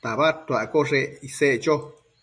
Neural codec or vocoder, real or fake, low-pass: none; real; 9.9 kHz